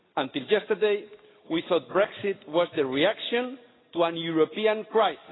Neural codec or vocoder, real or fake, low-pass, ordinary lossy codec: none; real; 7.2 kHz; AAC, 16 kbps